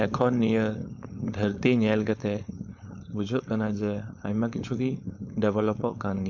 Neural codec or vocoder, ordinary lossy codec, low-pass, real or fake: codec, 16 kHz, 4.8 kbps, FACodec; none; 7.2 kHz; fake